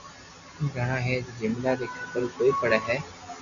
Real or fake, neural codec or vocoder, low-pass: real; none; 7.2 kHz